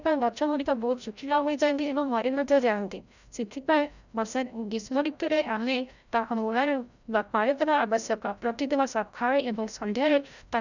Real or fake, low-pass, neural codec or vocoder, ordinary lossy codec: fake; 7.2 kHz; codec, 16 kHz, 0.5 kbps, FreqCodec, larger model; none